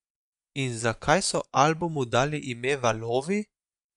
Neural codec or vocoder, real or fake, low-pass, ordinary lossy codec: vocoder, 24 kHz, 100 mel bands, Vocos; fake; 10.8 kHz; none